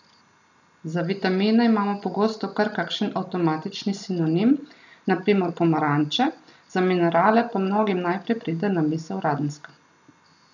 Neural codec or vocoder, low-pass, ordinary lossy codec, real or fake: none; 7.2 kHz; none; real